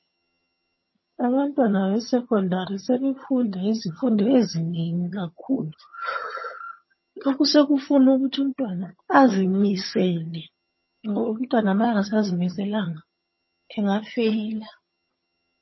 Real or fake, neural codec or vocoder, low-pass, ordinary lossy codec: fake; vocoder, 22.05 kHz, 80 mel bands, HiFi-GAN; 7.2 kHz; MP3, 24 kbps